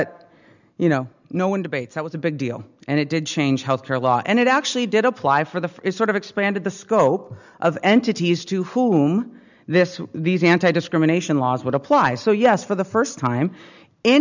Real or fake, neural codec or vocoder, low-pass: real; none; 7.2 kHz